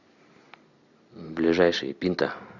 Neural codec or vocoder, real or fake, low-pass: none; real; 7.2 kHz